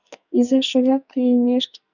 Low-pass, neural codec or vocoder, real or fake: 7.2 kHz; codec, 44.1 kHz, 2.6 kbps, SNAC; fake